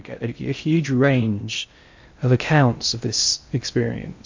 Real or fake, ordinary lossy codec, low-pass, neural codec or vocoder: fake; MP3, 64 kbps; 7.2 kHz; codec, 16 kHz in and 24 kHz out, 0.6 kbps, FocalCodec, streaming, 2048 codes